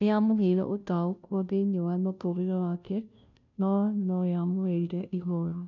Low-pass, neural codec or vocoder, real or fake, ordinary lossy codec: 7.2 kHz; codec, 16 kHz, 0.5 kbps, FunCodec, trained on Chinese and English, 25 frames a second; fake; none